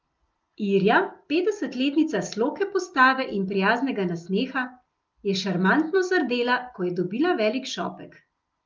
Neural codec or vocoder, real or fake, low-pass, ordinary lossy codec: none; real; 7.2 kHz; Opus, 24 kbps